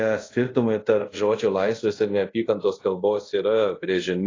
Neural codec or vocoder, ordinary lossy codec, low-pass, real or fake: codec, 24 kHz, 0.5 kbps, DualCodec; AAC, 32 kbps; 7.2 kHz; fake